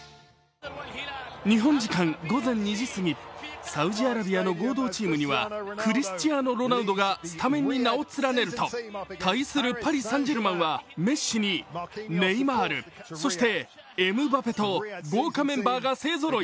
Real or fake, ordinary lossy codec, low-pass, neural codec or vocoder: real; none; none; none